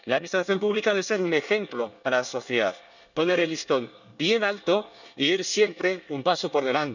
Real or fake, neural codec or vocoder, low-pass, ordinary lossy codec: fake; codec, 24 kHz, 1 kbps, SNAC; 7.2 kHz; none